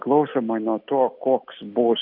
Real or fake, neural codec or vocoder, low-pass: real; none; 5.4 kHz